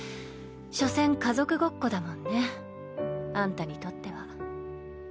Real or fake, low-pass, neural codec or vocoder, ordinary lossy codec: real; none; none; none